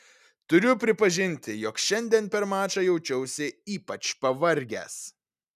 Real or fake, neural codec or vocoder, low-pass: real; none; 14.4 kHz